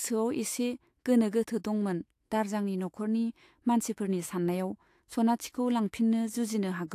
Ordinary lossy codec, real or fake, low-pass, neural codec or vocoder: AAC, 64 kbps; fake; 14.4 kHz; autoencoder, 48 kHz, 128 numbers a frame, DAC-VAE, trained on Japanese speech